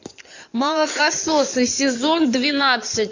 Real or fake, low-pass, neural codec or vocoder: fake; 7.2 kHz; codec, 16 kHz in and 24 kHz out, 2.2 kbps, FireRedTTS-2 codec